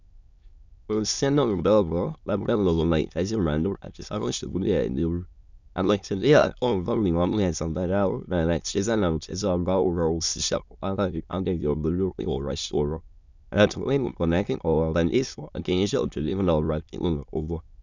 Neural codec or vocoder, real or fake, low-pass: autoencoder, 22.05 kHz, a latent of 192 numbers a frame, VITS, trained on many speakers; fake; 7.2 kHz